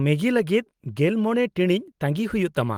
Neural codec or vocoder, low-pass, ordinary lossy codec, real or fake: none; 19.8 kHz; Opus, 24 kbps; real